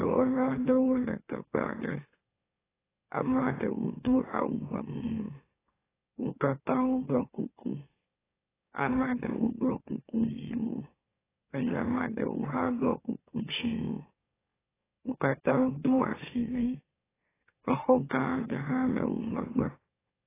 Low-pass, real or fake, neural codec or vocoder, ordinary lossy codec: 3.6 kHz; fake; autoencoder, 44.1 kHz, a latent of 192 numbers a frame, MeloTTS; AAC, 16 kbps